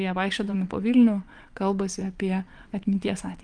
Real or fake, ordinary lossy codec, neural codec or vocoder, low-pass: fake; Opus, 32 kbps; vocoder, 22.05 kHz, 80 mel bands, WaveNeXt; 9.9 kHz